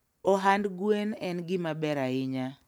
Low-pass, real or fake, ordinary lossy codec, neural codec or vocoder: none; real; none; none